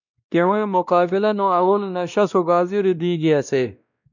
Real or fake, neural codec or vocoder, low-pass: fake; codec, 16 kHz, 1 kbps, X-Codec, WavLM features, trained on Multilingual LibriSpeech; 7.2 kHz